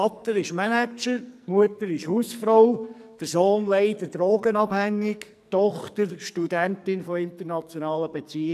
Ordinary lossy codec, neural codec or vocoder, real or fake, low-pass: none; codec, 44.1 kHz, 2.6 kbps, SNAC; fake; 14.4 kHz